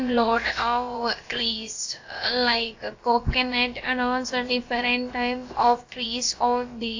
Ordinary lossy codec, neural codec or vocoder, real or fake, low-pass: AAC, 48 kbps; codec, 16 kHz, about 1 kbps, DyCAST, with the encoder's durations; fake; 7.2 kHz